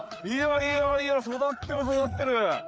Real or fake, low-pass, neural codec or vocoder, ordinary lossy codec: fake; none; codec, 16 kHz, 4 kbps, FreqCodec, larger model; none